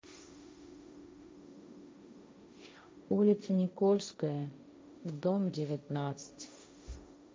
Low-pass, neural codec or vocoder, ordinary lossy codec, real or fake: none; codec, 16 kHz, 1.1 kbps, Voila-Tokenizer; none; fake